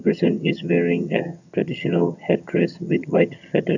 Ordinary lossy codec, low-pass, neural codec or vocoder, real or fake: none; 7.2 kHz; vocoder, 22.05 kHz, 80 mel bands, HiFi-GAN; fake